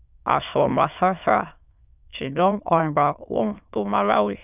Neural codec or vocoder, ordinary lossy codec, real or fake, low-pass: autoencoder, 22.05 kHz, a latent of 192 numbers a frame, VITS, trained on many speakers; none; fake; 3.6 kHz